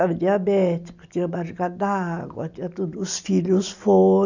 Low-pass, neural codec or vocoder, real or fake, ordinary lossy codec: 7.2 kHz; none; real; none